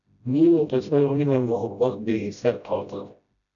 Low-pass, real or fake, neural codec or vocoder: 7.2 kHz; fake; codec, 16 kHz, 0.5 kbps, FreqCodec, smaller model